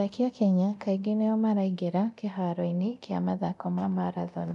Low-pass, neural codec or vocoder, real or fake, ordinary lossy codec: 10.8 kHz; codec, 24 kHz, 0.9 kbps, DualCodec; fake; none